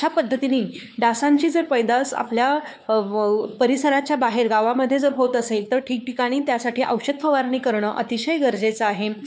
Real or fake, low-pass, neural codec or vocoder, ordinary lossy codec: fake; none; codec, 16 kHz, 4 kbps, X-Codec, WavLM features, trained on Multilingual LibriSpeech; none